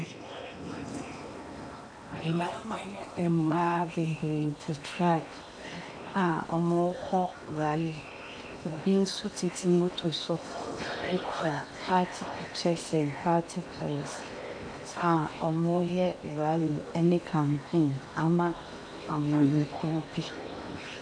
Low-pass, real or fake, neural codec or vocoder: 9.9 kHz; fake; codec, 16 kHz in and 24 kHz out, 0.8 kbps, FocalCodec, streaming, 65536 codes